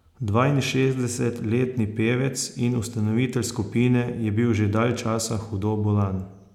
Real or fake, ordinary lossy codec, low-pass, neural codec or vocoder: real; none; 19.8 kHz; none